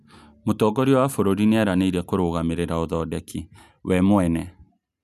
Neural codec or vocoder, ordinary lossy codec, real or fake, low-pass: none; none; real; 14.4 kHz